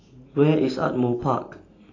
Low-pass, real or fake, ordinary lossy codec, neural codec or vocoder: 7.2 kHz; real; AAC, 32 kbps; none